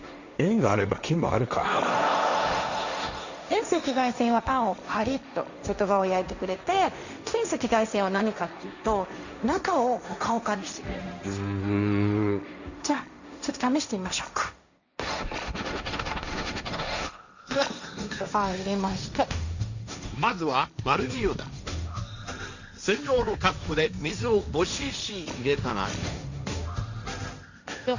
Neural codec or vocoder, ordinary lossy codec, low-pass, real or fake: codec, 16 kHz, 1.1 kbps, Voila-Tokenizer; none; 7.2 kHz; fake